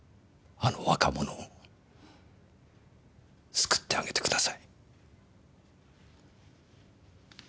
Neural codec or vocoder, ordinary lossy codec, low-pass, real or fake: none; none; none; real